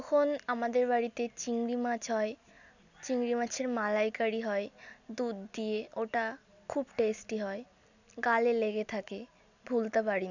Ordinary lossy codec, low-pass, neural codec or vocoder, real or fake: none; 7.2 kHz; none; real